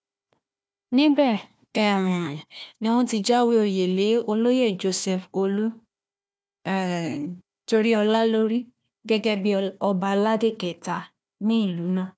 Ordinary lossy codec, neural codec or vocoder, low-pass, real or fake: none; codec, 16 kHz, 1 kbps, FunCodec, trained on Chinese and English, 50 frames a second; none; fake